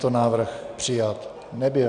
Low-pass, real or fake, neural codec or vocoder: 9.9 kHz; real; none